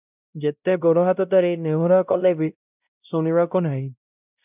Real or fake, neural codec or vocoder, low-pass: fake; codec, 16 kHz, 0.5 kbps, X-Codec, WavLM features, trained on Multilingual LibriSpeech; 3.6 kHz